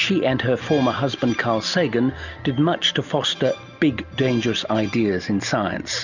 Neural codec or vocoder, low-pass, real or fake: none; 7.2 kHz; real